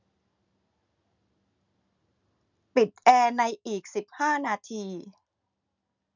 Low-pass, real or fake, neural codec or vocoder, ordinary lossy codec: 7.2 kHz; real; none; none